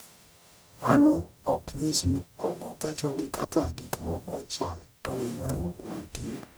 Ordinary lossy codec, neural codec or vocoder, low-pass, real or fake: none; codec, 44.1 kHz, 0.9 kbps, DAC; none; fake